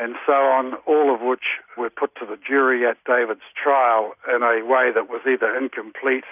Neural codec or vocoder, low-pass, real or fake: none; 3.6 kHz; real